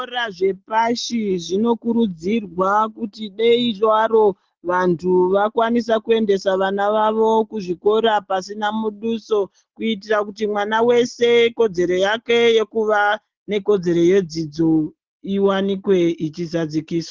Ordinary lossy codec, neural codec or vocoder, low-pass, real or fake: Opus, 16 kbps; none; 7.2 kHz; real